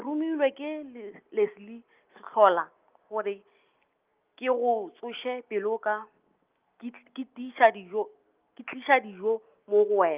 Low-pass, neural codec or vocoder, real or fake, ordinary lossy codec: 3.6 kHz; none; real; Opus, 24 kbps